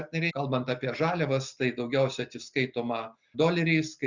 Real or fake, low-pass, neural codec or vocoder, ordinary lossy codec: real; 7.2 kHz; none; Opus, 64 kbps